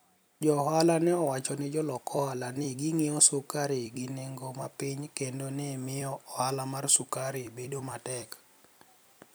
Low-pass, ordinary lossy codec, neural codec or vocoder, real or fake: none; none; vocoder, 44.1 kHz, 128 mel bands every 256 samples, BigVGAN v2; fake